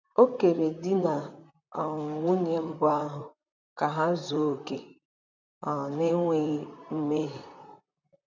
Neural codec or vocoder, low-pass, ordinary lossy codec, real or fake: vocoder, 44.1 kHz, 128 mel bands, Pupu-Vocoder; 7.2 kHz; none; fake